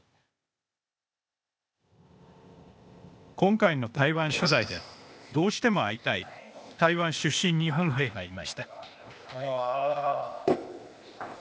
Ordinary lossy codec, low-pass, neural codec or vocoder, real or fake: none; none; codec, 16 kHz, 0.8 kbps, ZipCodec; fake